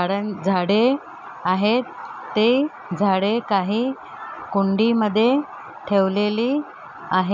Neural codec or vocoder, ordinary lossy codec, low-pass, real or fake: none; none; 7.2 kHz; real